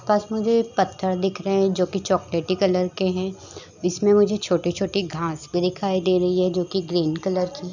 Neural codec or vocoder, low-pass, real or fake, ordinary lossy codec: none; 7.2 kHz; real; none